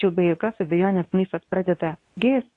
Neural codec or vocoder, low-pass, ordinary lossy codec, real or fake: codec, 24 kHz, 0.9 kbps, WavTokenizer, medium speech release version 2; 10.8 kHz; AAC, 48 kbps; fake